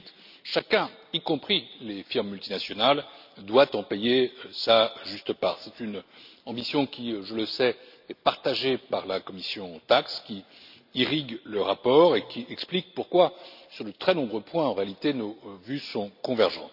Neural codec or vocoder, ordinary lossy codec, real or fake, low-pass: none; none; real; 5.4 kHz